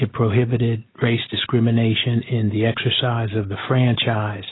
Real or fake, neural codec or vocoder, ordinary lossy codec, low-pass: real; none; AAC, 16 kbps; 7.2 kHz